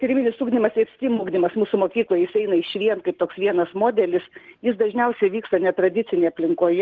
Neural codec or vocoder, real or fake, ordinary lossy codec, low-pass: none; real; Opus, 16 kbps; 7.2 kHz